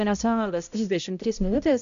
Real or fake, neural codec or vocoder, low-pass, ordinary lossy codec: fake; codec, 16 kHz, 0.5 kbps, X-Codec, HuBERT features, trained on balanced general audio; 7.2 kHz; MP3, 64 kbps